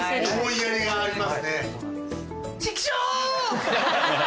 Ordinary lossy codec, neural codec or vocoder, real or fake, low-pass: none; none; real; none